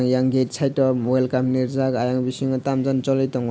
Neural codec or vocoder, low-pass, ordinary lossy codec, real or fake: none; none; none; real